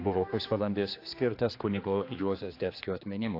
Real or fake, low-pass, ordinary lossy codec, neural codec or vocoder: fake; 5.4 kHz; AAC, 32 kbps; codec, 16 kHz, 2 kbps, X-Codec, HuBERT features, trained on general audio